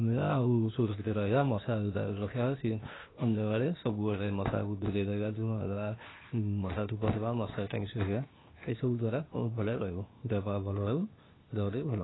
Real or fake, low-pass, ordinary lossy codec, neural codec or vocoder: fake; 7.2 kHz; AAC, 16 kbps; codec, 16 kHz, 0.8 kbps, ZipCodec